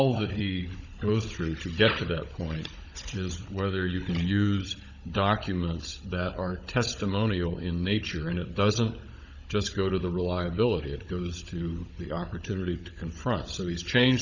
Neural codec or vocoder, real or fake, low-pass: codec, 16 kHz, 16 kbps, FunCodec, trained on Chinese and English, 50 frames a second; fake; 7.2 kHz